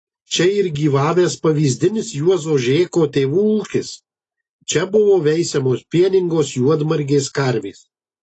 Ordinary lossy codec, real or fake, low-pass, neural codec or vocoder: AAC, 32 kbps; real; 10.8 kHz; none